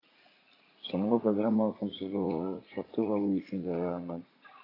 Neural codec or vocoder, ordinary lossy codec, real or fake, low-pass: vocoder, 44.1 kHz, 80 mel bands, Vocos; AAC, 24 kbps; fake; 5.4 kHz